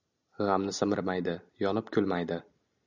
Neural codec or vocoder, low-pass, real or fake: none; 7.2 kHz; real